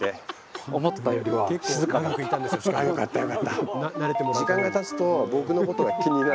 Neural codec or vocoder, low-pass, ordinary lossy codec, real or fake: none; none; none; real